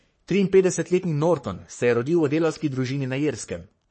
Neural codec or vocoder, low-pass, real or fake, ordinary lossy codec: codec, 44.1 kHz, 3.4 kbps, Pupu-Codec; 9.9 kHz; fake; MP3, 32 kbps